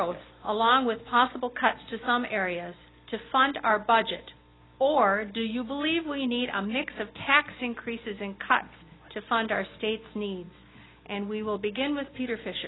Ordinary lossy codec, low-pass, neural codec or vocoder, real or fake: AAC, 16 kbps; 7.2 kHz; none; real